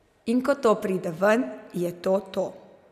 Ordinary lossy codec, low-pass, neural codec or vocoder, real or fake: none; 14.4 kHz; none; real